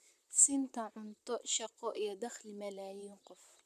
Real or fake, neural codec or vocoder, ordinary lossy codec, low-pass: fake; vocoder, 44.1 kHz, 128 mel bands, Pupu-Vocoder; none; 14.4 kHz